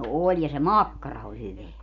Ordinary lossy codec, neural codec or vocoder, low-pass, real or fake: none; none; 7.2 kHz; real